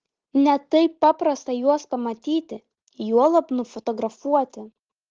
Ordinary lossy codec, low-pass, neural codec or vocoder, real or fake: Opus, 16 kbps; 7.2 kHz; none; real